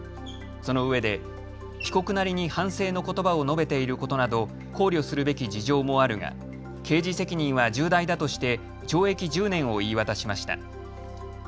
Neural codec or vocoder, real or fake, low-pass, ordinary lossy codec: none; real; none; none